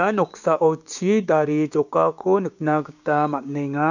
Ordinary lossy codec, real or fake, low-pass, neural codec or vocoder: none; fake; 7.2 kHz; codec, 16 kHz, 6 kbps, DAC